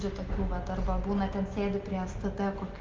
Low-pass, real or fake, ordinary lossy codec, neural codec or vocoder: 7.2 kHz; real; Opus, 24 kbps; none